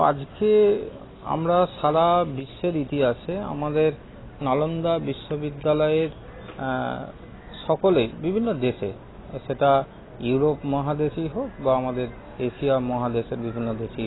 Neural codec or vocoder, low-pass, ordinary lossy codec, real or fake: none; 7.2 kHz; AAC, 16 kbps; real